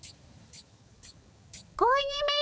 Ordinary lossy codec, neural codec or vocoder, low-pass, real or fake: none; codec, 16 kHz, 4 kbps, X-Codec, HuBERT features, trained on balanced general audio; none; fake